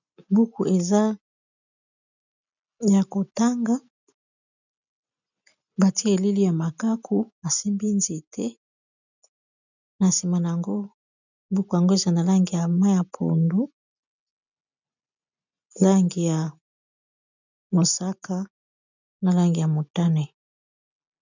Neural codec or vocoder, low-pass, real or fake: none; 7.2 kHz; real